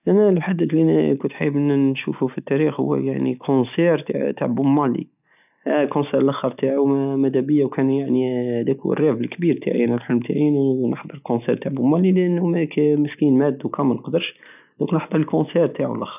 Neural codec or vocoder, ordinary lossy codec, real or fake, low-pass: none; none; real; 3.6 kHz